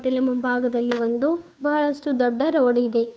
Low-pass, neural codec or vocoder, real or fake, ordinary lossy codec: none; codec, 16 kHz, 2 kbps, FunCodec, trained on Chinese and English, 25 frames a second; fake; none